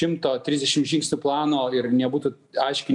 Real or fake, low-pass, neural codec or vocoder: real; 10.8 kHz; none